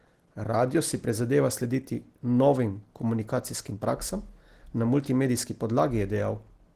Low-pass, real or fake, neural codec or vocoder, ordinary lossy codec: 14.4 kHz; real; none; Opus, 16 kbps